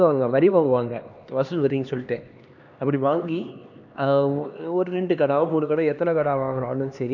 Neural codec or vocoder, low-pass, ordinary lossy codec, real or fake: codec, 16 kHz, 2 kbps, X-Codec, HuBERT features, trained on LibriSpeech; 7.2 kHz; none; fake